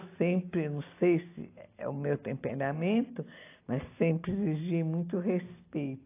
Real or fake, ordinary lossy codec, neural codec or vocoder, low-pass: real; AAC, 24 kbps; none; 3.6 kHz